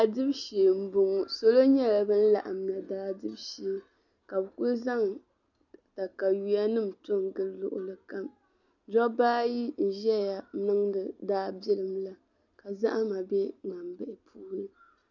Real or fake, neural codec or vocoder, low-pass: real; none; 7.2 kHz